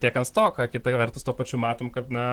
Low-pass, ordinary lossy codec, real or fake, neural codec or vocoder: 19.8 kHz; Opus, 16 kbps; fake; vocoder, 44.1 kHz, 128 mel bands, Pupu-Vocoder